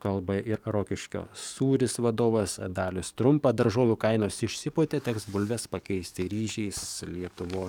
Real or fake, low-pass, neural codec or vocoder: fake; 19.8 kHz; codec, 44.1 kHz, 7.8 kbps, DAC